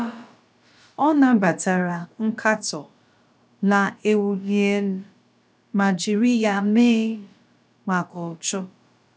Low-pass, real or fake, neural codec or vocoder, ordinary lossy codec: none; fake; codec, 16 kHz, about 1 kbps, DyCAST, with the encoder's durations; none